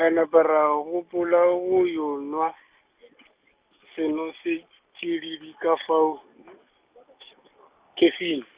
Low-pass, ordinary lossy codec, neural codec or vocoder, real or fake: 3.6 kHz; Opus, 64 kbps; none; real